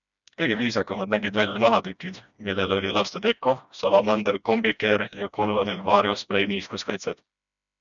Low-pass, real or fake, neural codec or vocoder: 7.2 kHz; fake; codec, 16 kHz, 1 kbps, FreqCodec, smaller model